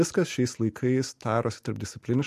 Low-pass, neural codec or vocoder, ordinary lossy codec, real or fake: 14.4 kHz; none; MP3, 64 kbps; real